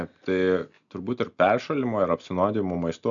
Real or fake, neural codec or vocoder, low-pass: real; none; 7.2 kHz